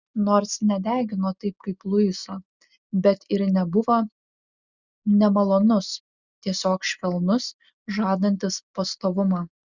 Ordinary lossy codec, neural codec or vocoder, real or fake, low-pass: Opus, 64 kbps; none; real; 7.2 kHz